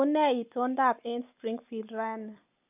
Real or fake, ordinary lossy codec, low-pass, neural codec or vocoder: real; none; 3.6 kHz; none